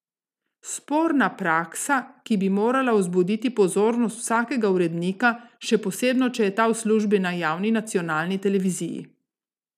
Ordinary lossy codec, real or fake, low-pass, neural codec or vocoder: none; real; 14.4 kHz; none